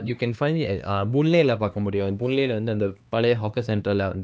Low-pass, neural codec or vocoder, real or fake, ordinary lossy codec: none; codec, 16 kHz, 2 kbps, X-Codec, HuBERT features, trained on LibriSpeech; fake; none